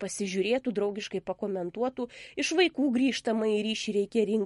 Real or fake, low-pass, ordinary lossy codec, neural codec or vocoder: real; 19.8 kHz; MP3, 48 kbps; none